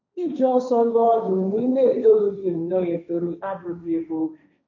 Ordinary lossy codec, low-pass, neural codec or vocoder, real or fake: MP3, 64 kbps; 7.2 kHz; codec, 16 kHz, 1.1 kbps, Voila-Tokenizer; fake